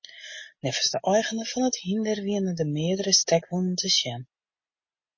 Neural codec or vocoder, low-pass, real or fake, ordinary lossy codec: none; 7.2 kHz; real; MP3, 32 kbps